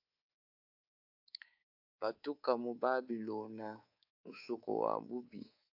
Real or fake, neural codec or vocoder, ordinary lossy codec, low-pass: fake; codec, 24 kHz, 3.1 kbps, DualCodec; AAC, 48 kbps; 5.4 kHz